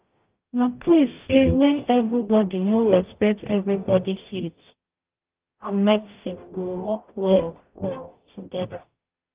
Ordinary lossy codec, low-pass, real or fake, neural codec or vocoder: Opus, 24 kbps; 3.6 kHz; fake; codec, 44.1 kHz, 0.9 kbps, DAC